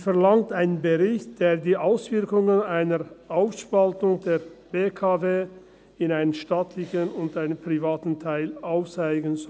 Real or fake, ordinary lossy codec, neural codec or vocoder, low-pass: real; none; none; none